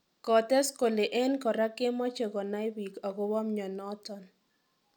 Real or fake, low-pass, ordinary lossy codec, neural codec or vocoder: real; 19.8 kHz; none; none